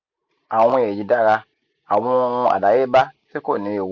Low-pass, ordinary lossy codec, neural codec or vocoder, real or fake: 7.2 kHz; MP3, 48 kbps; none; real